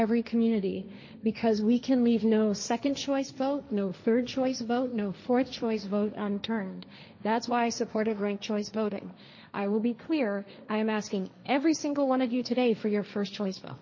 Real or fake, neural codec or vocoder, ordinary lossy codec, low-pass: fake; codec, 16 kHz, 1.1 kbps, Voila-Tokenizer; MP3, 32 kbps; 7.2 kHz